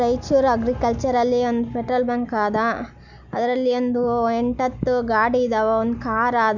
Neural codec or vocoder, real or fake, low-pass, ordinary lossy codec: none; real; 7.2 kHz; none